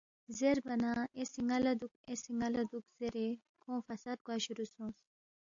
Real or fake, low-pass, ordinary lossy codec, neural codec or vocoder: real; 7.2 kHz; AAC, 64 kbps; none